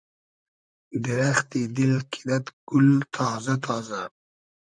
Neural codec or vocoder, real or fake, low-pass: vocoder, 44.1 kHz, 128 mel bands, Pupu-Vocoder; fake; 9.9 kHz